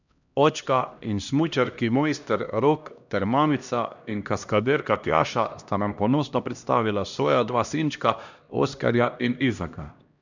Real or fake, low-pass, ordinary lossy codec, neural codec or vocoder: fake; 7.2 kHz; none; codec, 16 kHz, 1 kbps, X-Codec, HuBERT features, trained on LibriSpeech